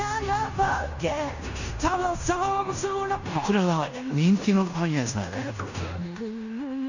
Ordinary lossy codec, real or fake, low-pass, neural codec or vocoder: none; fake; 7.2 kHz; codec, 16 kHz in and 24 kHz out, 0.9 kbps, LongCat-Audio-Codec, fine tuned four codebook decoder